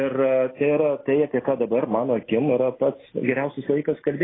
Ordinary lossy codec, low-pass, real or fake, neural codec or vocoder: AAC, 16 kbps; 7.2 kHz; real; none